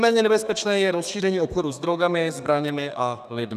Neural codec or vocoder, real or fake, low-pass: codec, 32 kHz, 1.9 kbps, SNAC; fake; 14.4 kHz